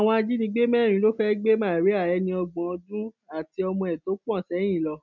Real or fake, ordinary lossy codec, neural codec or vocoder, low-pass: real; none; none; 7.2 kHz